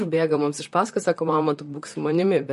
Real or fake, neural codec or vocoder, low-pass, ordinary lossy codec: fake; vocoder, 44.1 kHz, 128 mel bands, Pupu-Vocoder; 14.4 kHz; MP3, 48 kbps